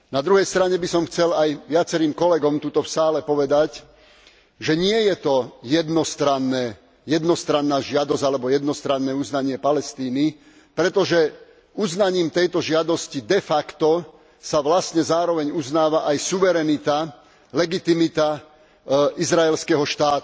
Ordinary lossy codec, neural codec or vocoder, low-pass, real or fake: none; none; none; real